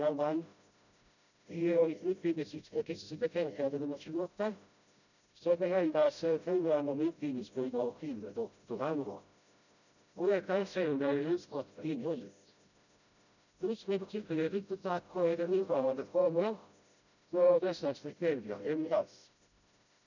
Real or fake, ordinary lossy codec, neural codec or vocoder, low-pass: fake; none; codec, 16 kHz, 0.5 kbps, FreqCodec, smaller model; 7.2 kHz